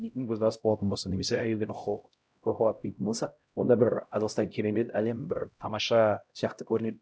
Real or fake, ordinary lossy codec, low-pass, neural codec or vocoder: fake; none; none; codec, 16 kHz, 0.5 kbps, X-Codec, HuBERT features, trained on LibriSpeech